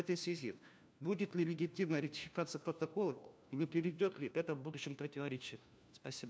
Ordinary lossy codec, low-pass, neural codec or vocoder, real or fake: none; none; codec, 16 kHz, 1 kbps, FunCodec, trained on LibriTTS, 50 frames a second; fake